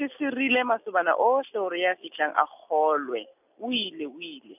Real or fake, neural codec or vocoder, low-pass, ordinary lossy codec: real; none; 3.6 kHz; none